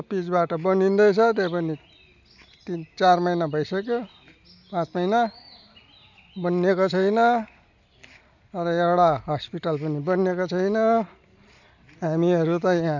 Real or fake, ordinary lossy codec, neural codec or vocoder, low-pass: real; none; none; 7.2 kHz